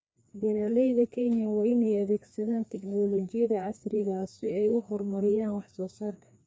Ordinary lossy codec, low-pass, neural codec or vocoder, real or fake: none; none; codec, 16 kHz, 2 kbps, FreqCodec, larger model; fake